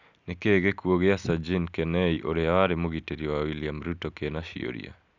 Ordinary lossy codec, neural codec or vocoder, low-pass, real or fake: none; none; 7.2 kHz; real